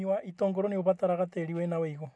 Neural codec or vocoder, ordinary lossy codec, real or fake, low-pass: none; none; real; none